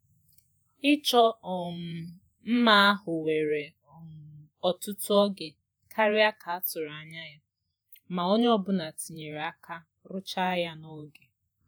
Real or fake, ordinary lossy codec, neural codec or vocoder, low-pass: fake; none; vocoder, 48 kHz, 128 mel bands, Vocos; none